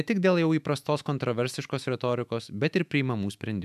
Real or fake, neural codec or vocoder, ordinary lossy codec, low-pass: fake; autoencoder, 48 kHz, 128 numbers a frame, DAC-VAE, trained on Japanese speech; AAC, 96 kbps; 14.4 kHz